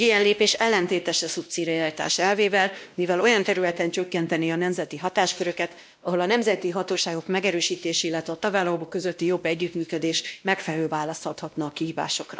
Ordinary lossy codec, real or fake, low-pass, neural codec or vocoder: none; fake; none; codec, 16 kHz, 1 kbps, X-Codec, WavLM features, trained on Multilingual LibriSpeech